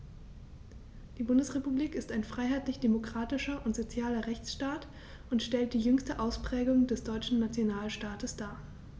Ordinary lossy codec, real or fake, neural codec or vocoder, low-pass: none; real; none; none